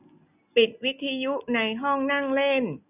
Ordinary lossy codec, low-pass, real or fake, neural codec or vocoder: none; 3.6 kHz; real; none